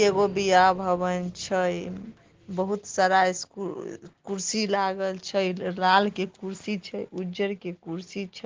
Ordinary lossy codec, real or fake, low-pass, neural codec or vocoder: Opus, 16 kbps; real; 7.2 kHz; none